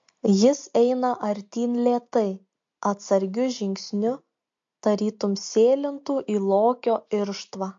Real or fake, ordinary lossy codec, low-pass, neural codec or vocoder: real; MP3, 48 kbps; 7.2 kHz; none